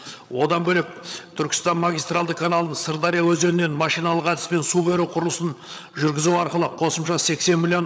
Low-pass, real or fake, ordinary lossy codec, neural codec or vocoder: none; fake; none; codec, 16 kHz, 8 kbps, FreqCodec, larger model